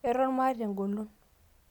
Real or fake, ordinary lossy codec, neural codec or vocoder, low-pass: real; none; none; 19.8 kHz